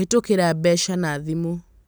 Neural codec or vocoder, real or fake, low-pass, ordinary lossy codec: none; real; none; none